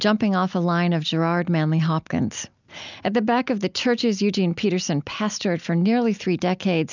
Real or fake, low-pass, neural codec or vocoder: real; 7.2 kHz; none